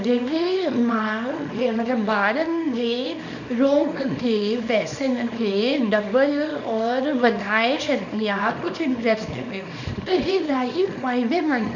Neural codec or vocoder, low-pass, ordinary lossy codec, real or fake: codec, 24 kHz, 0.9 kbps, WavTokenizer, small release; 7.2 kHz; none; fake